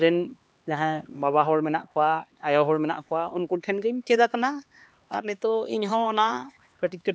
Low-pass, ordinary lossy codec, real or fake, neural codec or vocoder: none; none; fake; codec, 16 kHz, 2 kbps, X-Codec, HuBERT features, trained on LibriSpeech